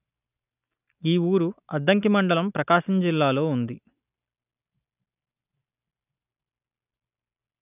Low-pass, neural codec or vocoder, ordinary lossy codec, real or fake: 3.6 kHz; none; none; real